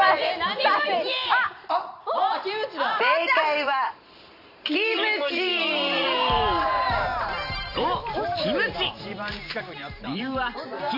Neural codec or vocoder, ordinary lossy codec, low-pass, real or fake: vocoder, 44.1 kHz, 128 mel bands every 512 samples, BigVGAN v2; AAC, 48 kbps; 5.4 kHz; fake